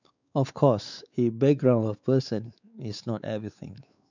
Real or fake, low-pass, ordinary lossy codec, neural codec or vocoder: fake; 7.2 kHz; none; codec, 16 kHz, 4 kbps, X-Codec, WavLM features, trained on Multilingual LibriSpeech